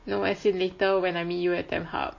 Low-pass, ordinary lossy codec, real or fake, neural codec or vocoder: 7.2 kHz; MP3, 32 kbps; real; none